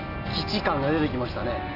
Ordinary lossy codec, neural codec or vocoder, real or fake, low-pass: AAC, 32 kbps; none; real; 5.4 kHz